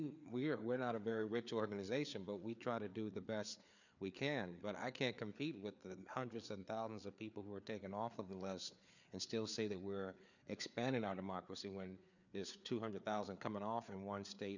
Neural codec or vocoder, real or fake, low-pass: codec, 16 kHz, 4 kbps, FreqCodec, larger model; fake; 7.2 kHz